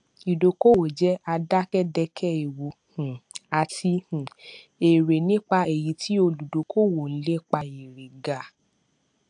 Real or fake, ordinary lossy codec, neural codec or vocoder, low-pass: real; AAC, 64 kbps; none; 9.9 kHz